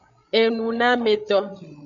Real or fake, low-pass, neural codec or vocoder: fake; 7.2 kHz; codec, 16 kHz, 16 kbps, FreqCodec, larger model